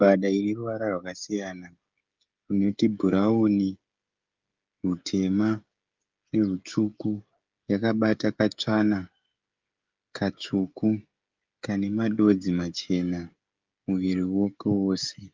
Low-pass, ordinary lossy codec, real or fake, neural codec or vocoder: 7.2 kHz; Opus, 24 kbps; fake; codec, 16 kHz, 16 kbps, FreqCodec, smaller model